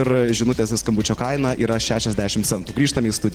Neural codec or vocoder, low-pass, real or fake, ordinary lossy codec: vocoder, 44.1 kHz, 128 mel bands every 512 samples, BigVGAN v2; 19.8 kHz; fake; Opus, 16 kbps